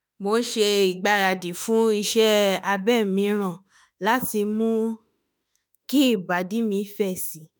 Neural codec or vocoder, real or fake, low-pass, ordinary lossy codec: autoencoder, 48 kHz, 32 numbers a frame, DAC-VAE, trained on Japanese speech; fake; none; none